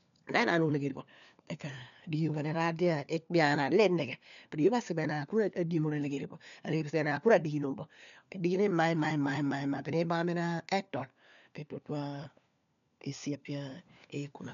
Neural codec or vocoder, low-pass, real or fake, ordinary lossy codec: codec, 16 kHz, 4 kbps, FunCodec, trained on LibriTTS, 50 frames a second; 7.2 kHz; fake; none